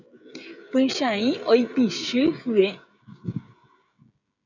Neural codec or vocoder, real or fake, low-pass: codec, 16 kHz, 16 kbps, FreqCodec, smaller model; fake; 7.2 kHz